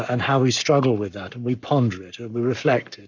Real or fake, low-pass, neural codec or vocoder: fake; 7.2 kHz; codec, 44.1 kHz, 7.8 kbps, Pupu-Codec